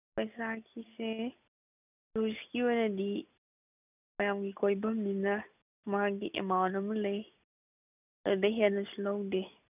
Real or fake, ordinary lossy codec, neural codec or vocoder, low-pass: real; none; none; 3.6 kHz